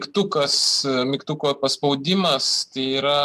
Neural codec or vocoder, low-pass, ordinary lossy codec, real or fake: none; 14.4 kHz; AAC, 96 kbps; real